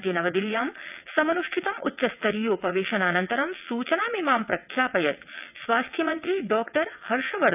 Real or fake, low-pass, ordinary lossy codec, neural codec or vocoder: fake; 3.6 kHz; none; vocoder, 22.05 kHz, 80 mel bands, WaveNeXt